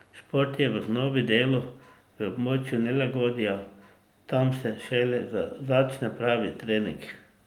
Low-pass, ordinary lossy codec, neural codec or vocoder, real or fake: 19.8 kHz; Opus, 32 kbps; none; real